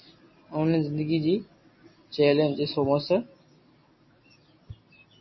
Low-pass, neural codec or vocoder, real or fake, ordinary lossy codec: 7.2 kHz; none; real; MP3, 24 kbps